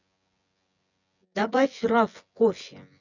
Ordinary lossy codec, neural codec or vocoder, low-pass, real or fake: none; vocoder, 24 kHz, 100 mel bands, Vocos; 7.2 kHz; fake